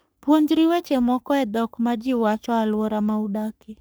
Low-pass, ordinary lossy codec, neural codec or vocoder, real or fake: none; none; codec, 44.1 kHz, 3.4 kbps, Pupu-Codec; fake